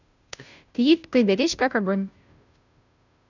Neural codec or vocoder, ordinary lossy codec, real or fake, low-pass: codec, 16 kHz, 0.5 kbps, FunCodec, trained on Chinese and English, 25 frames a second; none; fake; 7.2 kHz